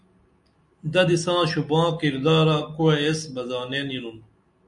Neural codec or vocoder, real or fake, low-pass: none; real; 10.8 kHz